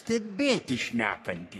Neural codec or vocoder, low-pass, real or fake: codec, 44.1 kHz, 3.4 kbps, Pupu-Codec; 14.4 kHz; fake